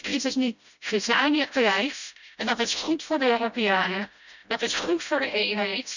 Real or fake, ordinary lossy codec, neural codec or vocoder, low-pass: fake; none; codec, 16 kHz, 0.5 kbps, FreqCodec, smaller model; 7.2 kHz